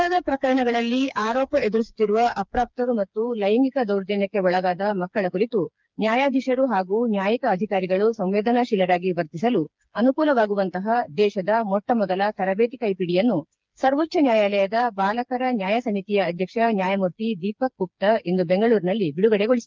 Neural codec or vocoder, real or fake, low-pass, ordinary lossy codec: codec, 16 kHz, 4 kbps, FreqCodec, smaller model; fake; 7.2 kHz; Opus, 24 kbps